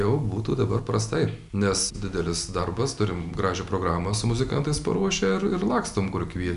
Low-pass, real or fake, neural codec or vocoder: 10.8 kHz; real; none